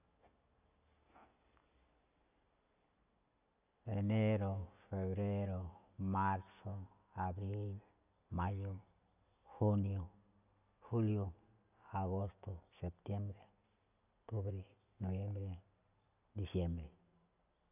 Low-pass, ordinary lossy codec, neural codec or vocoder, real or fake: 3.6 kHz; none; none; real